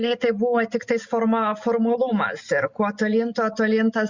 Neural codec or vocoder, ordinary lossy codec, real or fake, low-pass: none; Opus, 64 kbps; real; 7.2 kHz